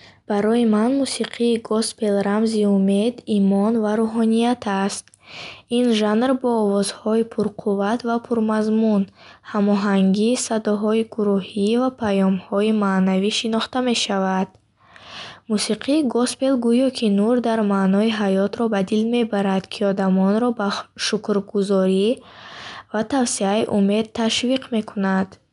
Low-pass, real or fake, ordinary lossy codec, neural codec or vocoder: 10.8 kHz; real; none; none